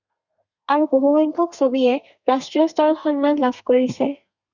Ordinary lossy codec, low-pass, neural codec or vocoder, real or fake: Opus, 64 kbps; 7.2 kHz; codec, 32 kHz, 1.9 kbps, SNAC; fake